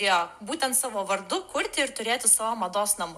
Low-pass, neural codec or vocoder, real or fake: 14.4 kHz; none; real